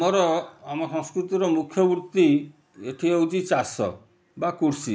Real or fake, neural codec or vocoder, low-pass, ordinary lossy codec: real; none; none; none